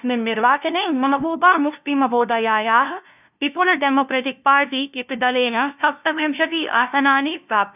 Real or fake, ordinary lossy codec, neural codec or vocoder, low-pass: fake; none; codec, 16 kHz, 0.5 kbps, FunCodec, trained on LibriTTS, 25 frames a second; 3.6 kHz